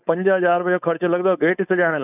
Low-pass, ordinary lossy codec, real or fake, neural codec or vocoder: 3.6 kHz; none; fake; codec, 16 kHz, 4.8 kbps, FACodec